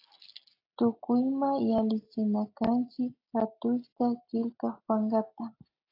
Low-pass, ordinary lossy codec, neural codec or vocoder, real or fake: 5.4 kHz; AAC, 32 kbps; none; real